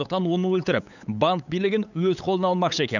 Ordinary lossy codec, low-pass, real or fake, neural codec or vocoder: none; 7.2 kHz; fake; codec, 16 kHz, 8 kbps, FunCodec, trained on LibriTTS, 25 frames a second